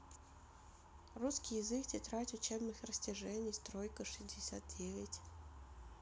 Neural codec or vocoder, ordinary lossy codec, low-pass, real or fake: none; none; none; real